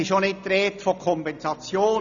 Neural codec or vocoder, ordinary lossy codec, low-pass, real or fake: none; none; 7.2 kHz; real